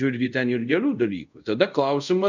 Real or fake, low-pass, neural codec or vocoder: fake; 7.2 kHz; codec, 24 kHz, 0.5 kbps, DualCodec